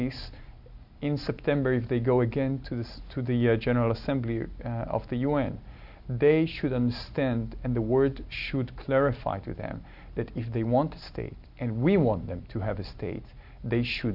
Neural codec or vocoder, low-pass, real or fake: none; 5.4 kHz; real